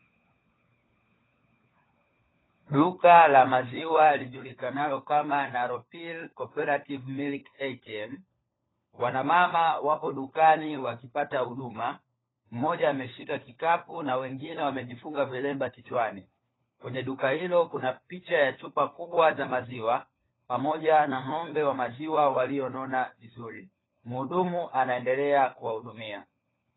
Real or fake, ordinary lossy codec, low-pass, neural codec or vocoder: fake; AAC, 16 kbps; 7.2 kHz; codec, 16 kHz, 4 kbps, FunCodec, trained on LibriTTS, 50 frames a second